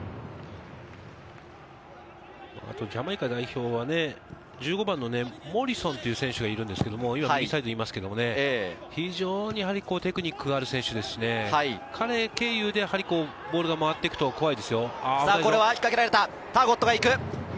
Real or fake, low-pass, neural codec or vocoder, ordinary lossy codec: real; none; none; none